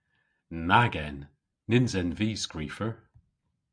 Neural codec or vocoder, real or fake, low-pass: none; real; 9.9 kHz